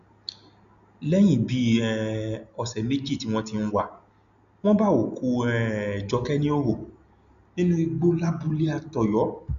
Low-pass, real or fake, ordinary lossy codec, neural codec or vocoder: 7.2 kHz; real; none; none